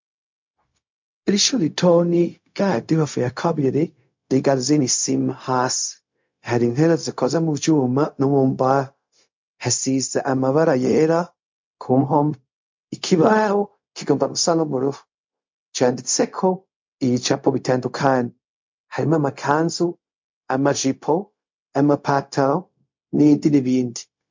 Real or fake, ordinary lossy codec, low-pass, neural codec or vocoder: fake; MP3, 48 kbps; 7.2 kHz; codec, 16 kHz, 0.4 kbps, LongCat-Audio-Codec